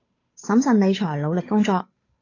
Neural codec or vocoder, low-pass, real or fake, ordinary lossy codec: codec, 16 kHz, 8 kbps, FunCodec, trained on Chinese and English, 25 frames a second; 7.2 kHz; fake; AAC, 32 kbps